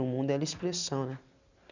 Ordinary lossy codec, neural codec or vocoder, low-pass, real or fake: none; none; 7.2 kHz; real